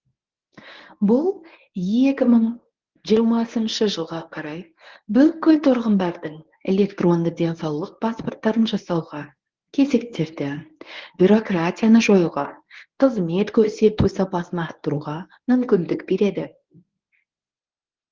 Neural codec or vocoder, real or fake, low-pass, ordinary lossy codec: codec, 24 kHz, 0.9 kbps, WavTokenizer, medium speech release version 1; fake; 7.2 kHz; Opus, 16 kbps